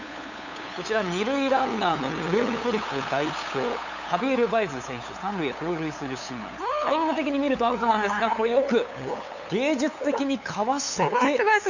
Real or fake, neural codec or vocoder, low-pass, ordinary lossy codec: fake; codec, 16 kHz, 8 kbps, FunCodec, trained on LibriTTS, 25 frames a second; 7.2 kHz; none